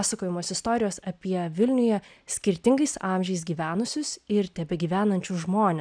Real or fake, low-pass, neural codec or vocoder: real; 9.9 kHz; none